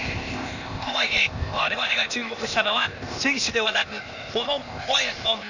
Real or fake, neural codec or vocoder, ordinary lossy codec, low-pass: fake; codec, 16 kHz, 0.8 kbps, ZipCodec; none; 7.2 kHz